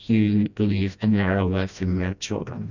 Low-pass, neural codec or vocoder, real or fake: 7.2 kHz; codec, 16 kHz, 1 kbps, FreqCodec, smaller model; fake